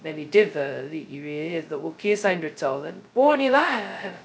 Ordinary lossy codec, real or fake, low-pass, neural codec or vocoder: none; fake; none; codec, 16 kHz, 0.2 kbps, FocalCodec